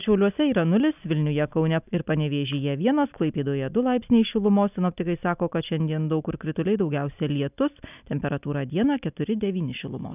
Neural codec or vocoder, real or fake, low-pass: none; real; 3.6 kHz